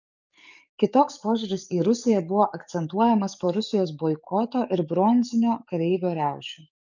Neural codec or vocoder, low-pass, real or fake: codec, 44.1 kHz, 7.8 kbps, DAC; 7.2 kHz; fake